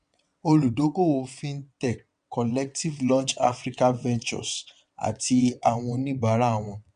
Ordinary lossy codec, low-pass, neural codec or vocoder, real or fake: MP3, 96 kbps; 9.9 kHz; vocoder, 22.05 kHz, 80 mel bands, WaveNeXt; fake